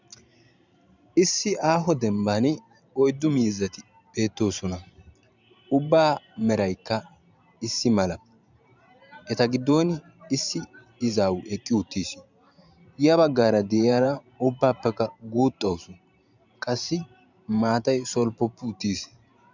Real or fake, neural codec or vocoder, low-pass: fake; vocoder, 44.1 kHz, 128 mel bands every 512 samples, BigVGAN v2; 7.2 kHz